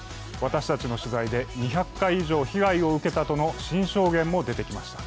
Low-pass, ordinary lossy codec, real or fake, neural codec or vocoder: none; none; real; none